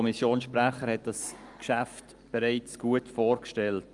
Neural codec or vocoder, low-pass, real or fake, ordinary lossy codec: none; 10.8 kHz; real; Opus, 32 kbps